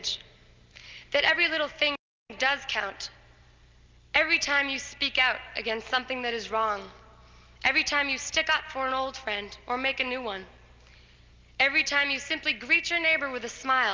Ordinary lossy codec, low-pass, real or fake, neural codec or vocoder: Opus, 32 kbps; 7.2 kHz; real; none